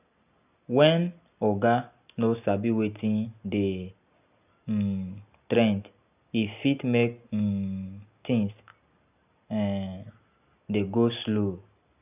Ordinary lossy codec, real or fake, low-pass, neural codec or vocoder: none; real; 3.6 kHz; none